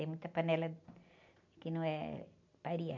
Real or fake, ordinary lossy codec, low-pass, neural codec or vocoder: real; none; 7.2 kHz; none